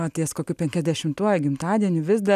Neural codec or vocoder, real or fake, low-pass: none; real; 14.4 kHz